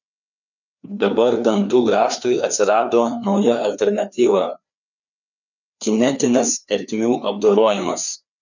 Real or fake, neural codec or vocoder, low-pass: fake; codec, 16 kHz, 2 kbps, FreqCodec, larger model; 7.2 kHz